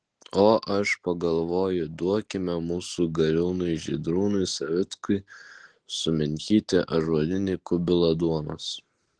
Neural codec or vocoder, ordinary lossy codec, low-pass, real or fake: none; Opus, 16 kbps; 9.9 kHz; real